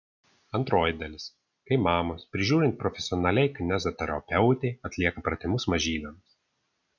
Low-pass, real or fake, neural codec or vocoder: 7.2 kHz; real; none